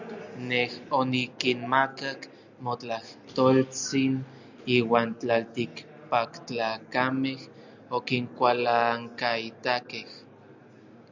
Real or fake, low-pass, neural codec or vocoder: real; 7.2 kHz; none